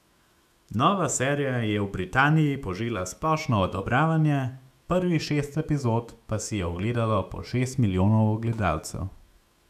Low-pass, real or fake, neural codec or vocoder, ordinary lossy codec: 14.4 kHz; fake; autoencoder, 48 kHz, 128 numbers a frame, DAC-VAE, trained on Japanese speech; none